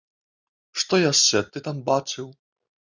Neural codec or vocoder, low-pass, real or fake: none; 7.2 kHz; real